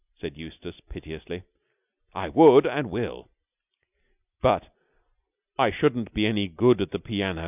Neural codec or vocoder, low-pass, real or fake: none; 3.6 kHz; real